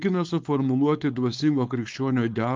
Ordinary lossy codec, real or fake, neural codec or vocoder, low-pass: Opus, 32 kbps; fake; codec, 16 kHz, 4.8 kbps, FACodec; 7.2 kHz